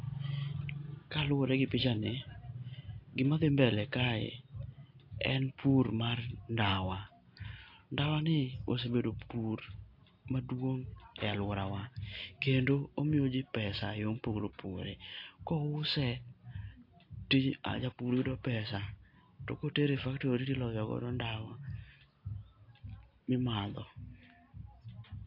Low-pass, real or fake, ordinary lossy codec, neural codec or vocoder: 5.4 kHz; real; AAC, 32 kbps; none